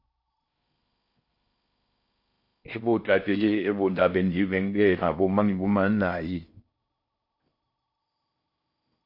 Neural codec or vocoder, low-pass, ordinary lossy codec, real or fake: codec, 16 kHz in and 24 kHz out, 0.6 kbps, FocalCodec, streaming, 4096 codes; 5.4 kHz; MP3, 32 kbps; fake